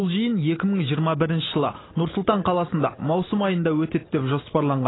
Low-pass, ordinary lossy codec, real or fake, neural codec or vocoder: 7.2 kHz; AAC, 16 kbps; real; none